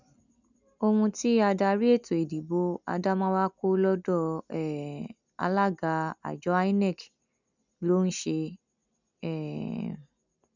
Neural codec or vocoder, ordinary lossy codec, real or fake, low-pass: none; none; real; 7.2 kHz